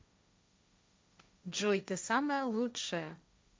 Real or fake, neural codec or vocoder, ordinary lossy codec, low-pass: fake; codec, 16 kHz, 1.1 kbps, Voila-Tokenizer; none; none